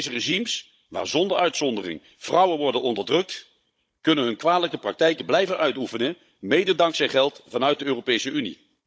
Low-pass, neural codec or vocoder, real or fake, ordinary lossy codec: none; codec, 16 kHz, 16 kbps, FunCodec, trained on Chinese and English, 50 frames a second; fake; none